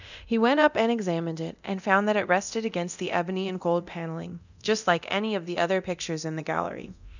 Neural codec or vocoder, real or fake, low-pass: codec, 24 kHz, 0.9 kbps, DualCodec; fake; 7.2 kHz